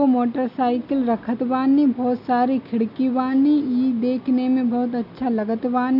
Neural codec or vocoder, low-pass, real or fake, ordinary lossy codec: none; 5.4 kHz; real; none